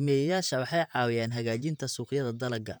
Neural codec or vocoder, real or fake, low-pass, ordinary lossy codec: vocoder, 44.1 kHz, 128 mel bands, Pupu-Vocoder; fake; none; none